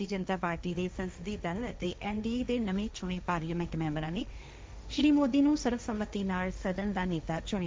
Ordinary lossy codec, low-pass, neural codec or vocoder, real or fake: none; none; codec, 16 kHz, 1.1 kbps, Voila-Tokenizer; fake